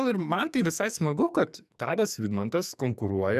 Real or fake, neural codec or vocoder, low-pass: fake; codec, 44.1 kHz, 2.6 kbps, SNAC; 14.4 kHz